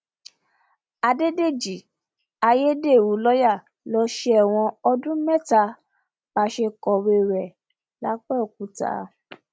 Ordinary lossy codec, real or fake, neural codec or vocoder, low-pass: none; real; none; none